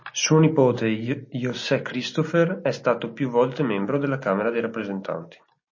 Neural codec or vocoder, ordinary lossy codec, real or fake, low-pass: none; MP3, 32 kbps; real; 7.2 kHz